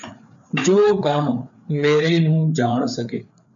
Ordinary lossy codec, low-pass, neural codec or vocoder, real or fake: MP3, 96 kbps; 7.2 kHz; codec, 16 kHz, 4 kbps, FreqCodec, larger model; fake